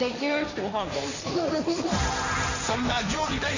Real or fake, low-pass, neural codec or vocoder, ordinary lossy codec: fake; 7.2 kHz; codec, 16 kHz, 1.1 kbps, Voila-Tokenizer; none